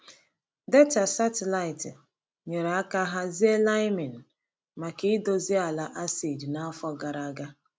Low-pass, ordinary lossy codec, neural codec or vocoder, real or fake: none; none; none; real